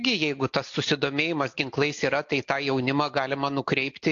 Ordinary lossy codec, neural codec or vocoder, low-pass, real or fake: AAC, 48 kbps; none; 7.2 kHz; real